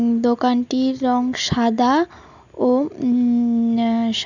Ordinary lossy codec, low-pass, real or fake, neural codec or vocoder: none; 7.2 kHz; real; none